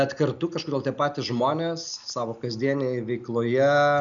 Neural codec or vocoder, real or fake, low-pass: none; real; 7.2 kHz